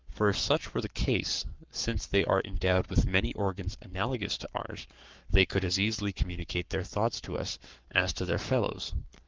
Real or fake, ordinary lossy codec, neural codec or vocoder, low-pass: fake; Opus, 24 kbps; codec, 44.1 kHz, 7.8 kbps, Pupu-Codec; 7.2 kHz